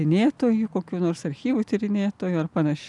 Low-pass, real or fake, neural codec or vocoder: 10.8 kHz; real; none